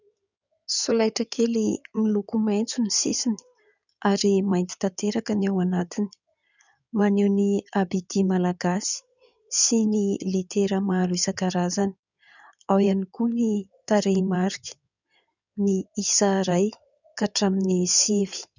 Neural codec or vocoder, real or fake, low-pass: codec, 16 kHz in and 24 kHz out, 2.2 kbps, FireRedTTS-2 codec; fake; 7.2 kHz